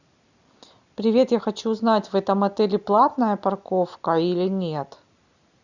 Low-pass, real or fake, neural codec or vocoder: 7.2 kHz; real; none